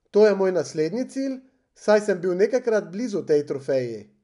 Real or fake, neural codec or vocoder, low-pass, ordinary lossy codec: real; none; 10.8 kHz; none